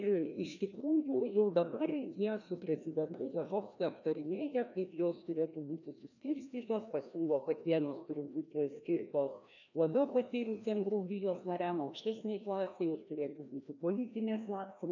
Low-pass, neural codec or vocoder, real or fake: 7.2 kHz; codec, 16 kHz, 1 kbps, FreqCodec, larger model; fake